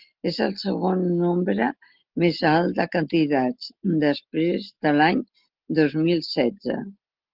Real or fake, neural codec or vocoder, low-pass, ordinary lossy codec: real; none; 5.4 kHz; Opus, 32 kbps